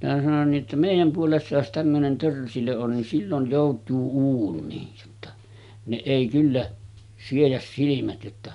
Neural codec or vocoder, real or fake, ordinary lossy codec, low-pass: none; real; none; 10.8 kHz